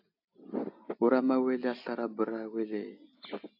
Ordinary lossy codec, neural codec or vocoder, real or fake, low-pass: AAC, 48 kbps; none; real; 5.4 kHz